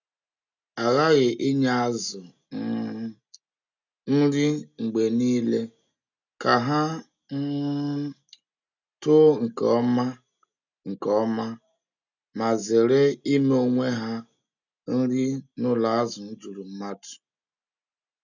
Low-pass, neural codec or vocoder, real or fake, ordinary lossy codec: 7.2 kHz; none; real; none